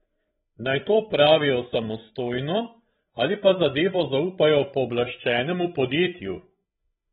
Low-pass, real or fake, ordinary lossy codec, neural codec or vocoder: 7.2 kHz; fake; AAC, 16 kbps; codec, 16 kHz, 16 kbps, FreqCodec, larger model